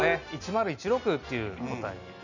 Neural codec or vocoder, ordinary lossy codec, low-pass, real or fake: none; none; 7.2 kHz; real